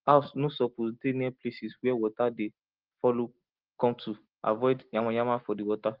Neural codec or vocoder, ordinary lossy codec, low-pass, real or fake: none; Opus, 16 kbps; 5.4 kHz; real